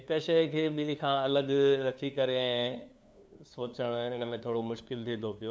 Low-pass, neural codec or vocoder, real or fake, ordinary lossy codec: none; codec, 16 kHz, 2 kbps, FunCodec, trained on LibriTTS, 25 frames a second; fake; none